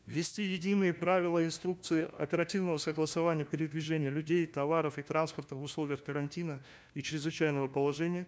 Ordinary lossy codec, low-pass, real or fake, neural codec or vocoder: none; none; fake; codec, 16 kHz, 1 kbps, FunCodec, trained on LibriTTS, 50 frames a second